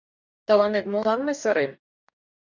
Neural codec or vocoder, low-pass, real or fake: codec, 44.1 kHz, 2.6 kbps, DAC; 7.2 kHz; fake